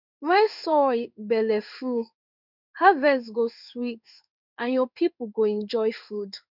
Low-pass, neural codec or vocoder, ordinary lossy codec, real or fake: 5.4 kHz; codec, 16 kHz in and 24 kHz out, 1 kbps, XY-Tokenizer; none; fake